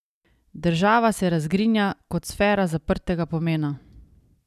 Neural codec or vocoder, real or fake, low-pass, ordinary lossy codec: none; real; 14.4 kHz; none